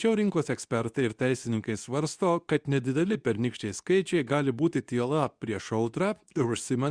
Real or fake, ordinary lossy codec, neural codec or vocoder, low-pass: fake; Opus, 64 kbps; codec, 24 kHz, 0.9 kbps, WavTokenizer, medium speech release version 2; 9.9 kHz